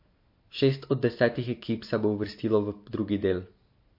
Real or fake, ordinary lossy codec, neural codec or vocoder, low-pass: real; MP3, 32 kbps; none; 5.4 kHz